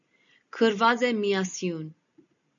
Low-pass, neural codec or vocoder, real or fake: 7.2 kHz; none; real